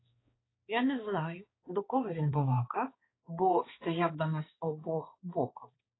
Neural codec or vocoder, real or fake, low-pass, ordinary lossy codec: codec, 16 kHz, 2 kbps, X-Codec, HuBERT features, trained on balanced general audio; fake; 7.2 kHz; AAC, 16 kbps